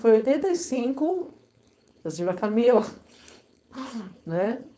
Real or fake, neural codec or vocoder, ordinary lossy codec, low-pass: fake; codec, 16 kHz, 4.8 kbps, FACodec; none; none